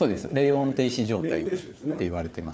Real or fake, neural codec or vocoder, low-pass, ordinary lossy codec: fake; codec, 16 kHz, 4 kbps, FunCodec, trained on Chinese and English, 50 frames a second; none; none